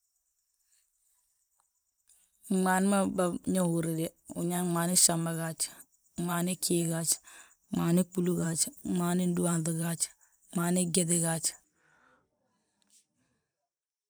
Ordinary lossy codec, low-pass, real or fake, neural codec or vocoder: none; none; fake; vocoder, 44.1 kHz, 128 mel bands every 512 samples, BigVGAN v2